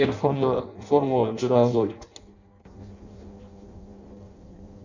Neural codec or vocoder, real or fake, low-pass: codec, 16 kHz in and 24 kHz out, 0.6 kbps, FireRedTTS-2 codec; fake; 7.2 kHz